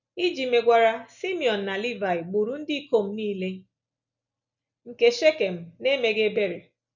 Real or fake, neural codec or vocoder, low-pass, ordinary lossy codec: real; none; 7.2 kHz; none